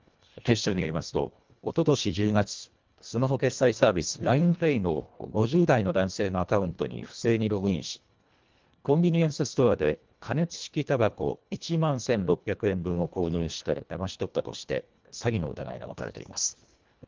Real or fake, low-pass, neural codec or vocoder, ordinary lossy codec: fake; 7.2 kHz; codec, 24 kHz, 1.5 kbps, HILCodec; Opus, 32 kbps